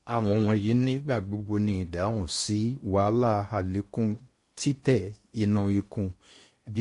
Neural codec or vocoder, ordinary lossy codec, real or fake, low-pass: codec, 16 kHz in and 24 kHz out, 0.8 kbps, FocalCodec, streaming, 65536 codes; MP3, 48 kbps; fake; 10.8 kHz